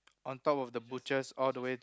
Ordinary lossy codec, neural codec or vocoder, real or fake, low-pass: none; none; real; none